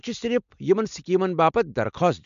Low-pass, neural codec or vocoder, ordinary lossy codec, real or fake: 7.2 kHz; none; MP3, 64 kbps; real